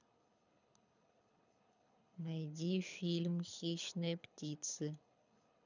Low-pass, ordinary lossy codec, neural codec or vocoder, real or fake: 7.2 kHz; none; codec, 24 kHz, 6 kbps, HILCodec; fake